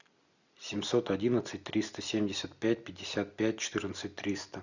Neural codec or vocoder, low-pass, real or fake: none; 7.2 kHz; real